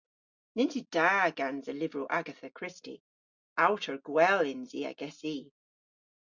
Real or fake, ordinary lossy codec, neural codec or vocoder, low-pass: real; Opus, 64 kbps; none; 7.2 kHz